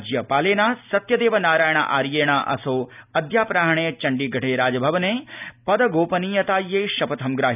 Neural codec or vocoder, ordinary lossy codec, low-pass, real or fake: none; none; 3.6 kHz; real